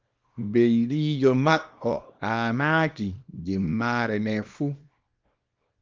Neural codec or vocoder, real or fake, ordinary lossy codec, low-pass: codec, 24 kHz, 0.9 kbps, WavTokenizer, small release; fake; Opus, 32 kbps; 7.2 kHz